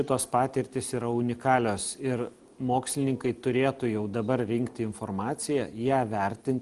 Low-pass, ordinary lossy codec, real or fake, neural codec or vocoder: 10.8 kHz; Opus, 24 kbps; real; none